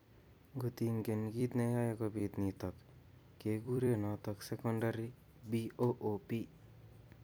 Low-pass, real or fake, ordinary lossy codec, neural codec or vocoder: none; fake; none; vocoder, 44.1 kHz, 128 mel bands every 256 samples, BigVGAN v2